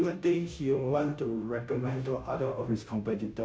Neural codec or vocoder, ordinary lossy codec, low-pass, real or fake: codec, 16 kHz, 0.5 kbps, FunCodec, trained on Chinese and English, 25 frames a second; none; none; fake